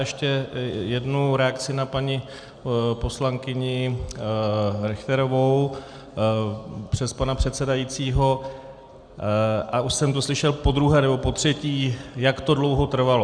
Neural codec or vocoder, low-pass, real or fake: none; 9.9 kHz; real